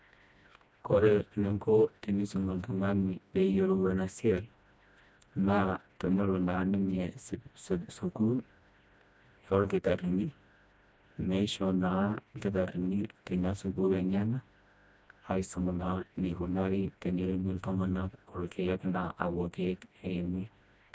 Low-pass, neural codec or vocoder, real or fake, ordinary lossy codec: none; codec, 16 kHz, 1 kbps, FreqCodec, smaller model; fake; none